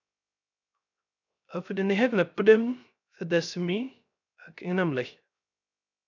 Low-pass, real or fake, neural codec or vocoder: 7.2 kHz; fake; codec, 16 kHz, 0.3 kbps, FocalCodec